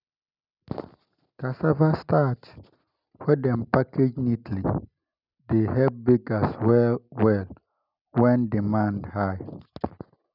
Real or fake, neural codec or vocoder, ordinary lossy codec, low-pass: real; none; none; 5.4 kHz